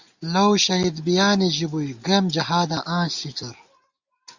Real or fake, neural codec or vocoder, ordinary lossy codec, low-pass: real; none; Opus, 64 kbps; 7.2 kHz